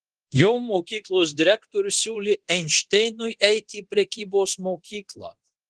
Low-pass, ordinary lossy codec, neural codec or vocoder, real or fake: 10.8 kHz; Opus, 16 kbps; codec, 24 kHz, 0.9 kbps, DualCodec; fake